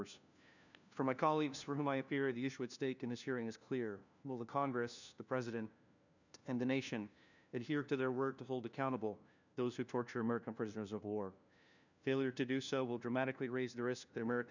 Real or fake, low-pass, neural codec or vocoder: fake; 7.2 kHz; codec, 16 kHz, 1 kbps, FunCodec, trained on LibriTTS, 50 frames a second